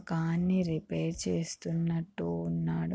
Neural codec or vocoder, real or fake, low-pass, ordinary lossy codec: none; real; none; none